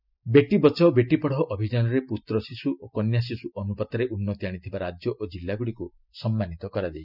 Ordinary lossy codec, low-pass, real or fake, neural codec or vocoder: none; 5.4 kHz; real; none